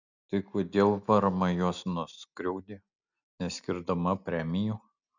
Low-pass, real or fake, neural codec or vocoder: 7.2 kHz; real; none